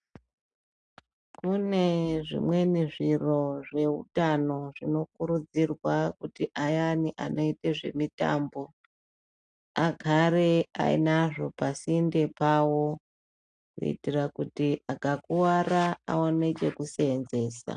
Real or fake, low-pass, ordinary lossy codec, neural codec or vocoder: real; 9.9 kHz; AAC, 64 kbps; none